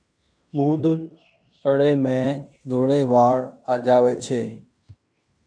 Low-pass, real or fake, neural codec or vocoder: 9.9 kHz; fake; codec, 16 kHz in and 24 kHz out, 0.9 kbps, LongCat-Audio-Codec, fine tuned four codebook decoder